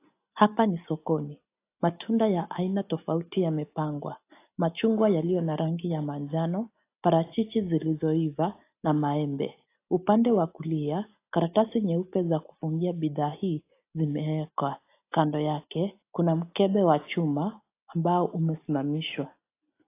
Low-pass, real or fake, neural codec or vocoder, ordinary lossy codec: 3.6 kHz; real; none; AAC, 24 kbps